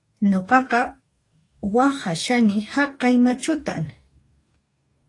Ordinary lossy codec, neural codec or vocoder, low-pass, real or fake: AAC, 48 kbps; codec, 44.1 kHz, 2.6 kbps, DAC; 10.8 kHz; fake